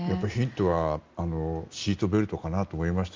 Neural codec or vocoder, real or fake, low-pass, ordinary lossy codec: none; real; 7.2 kHz; Opus, 32 kbps